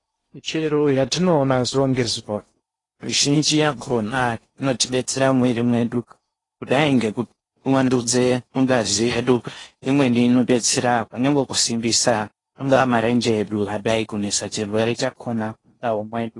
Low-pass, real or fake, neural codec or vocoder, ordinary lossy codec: 10.8 kHz; fake; codec, 16 kHz in and 24 kHz out, 0.8 kbps, FocalCodec, streaming, 65536 codes; AAC, 32 kbps